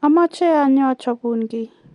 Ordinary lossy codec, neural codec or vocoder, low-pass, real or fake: MP3, 64 kbps; none; 10.8 kHz; real